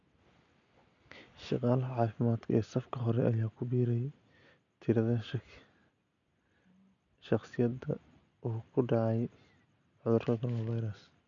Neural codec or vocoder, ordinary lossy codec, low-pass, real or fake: none; none; 7.2 kHz; real